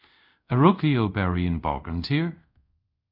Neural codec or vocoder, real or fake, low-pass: codec, 24 kHz, 0.5 kbps, DualCodec; fake; 5.4 kHz